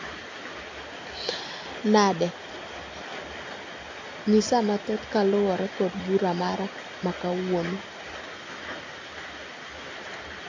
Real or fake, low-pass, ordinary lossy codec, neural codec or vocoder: real; 7.2 kHz; MP3, 48 kbps; none